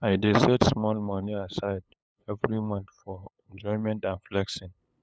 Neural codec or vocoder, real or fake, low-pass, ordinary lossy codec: codec, 16 kHz, 8 kbps, FunCodec, trained on LibriTTS, 25 frames a second; fake; none; none